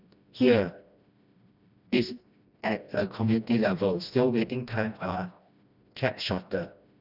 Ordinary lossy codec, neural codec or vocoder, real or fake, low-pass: none; codec, 16 kHz, 1 kbps, FreqCodec, smaller model; fake; 5.4 kHz